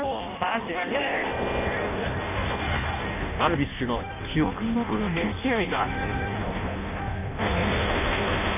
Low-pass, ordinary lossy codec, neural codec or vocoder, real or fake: 3.6 kHz; none; codec, 16 kHz in and 24 kHz out, 0.6 kbps, FireRedTTS-2 codec; fake